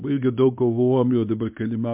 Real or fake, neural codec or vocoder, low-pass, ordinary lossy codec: fake; codec, 24 kHz, 0.9 kbps, WavTokenizer, medium speech release version 1; 3.6 kHz; MP3, 32 kbps